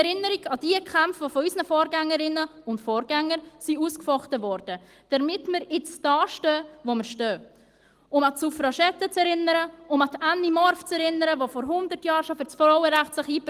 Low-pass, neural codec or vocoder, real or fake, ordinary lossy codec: 14.4 kHz; none; real; Opus, 24 kbps